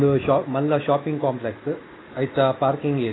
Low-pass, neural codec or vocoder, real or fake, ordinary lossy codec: 7.2 kHz; none; real; AAC, 16 kbps